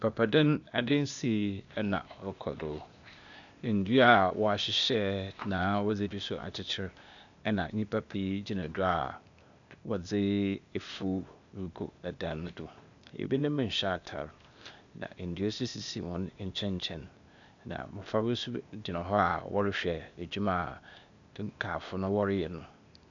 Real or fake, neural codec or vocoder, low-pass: fake; codec, 16 kHz, 0.8 kbps, ZipCodec; 7.2 kHz